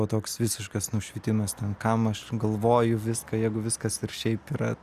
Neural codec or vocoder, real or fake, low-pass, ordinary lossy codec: none; real; 14.4 kHz; Opus, 64 kbps